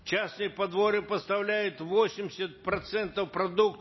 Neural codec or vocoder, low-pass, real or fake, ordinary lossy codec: none; 7.2 kHz; real; MP3, 24 kbps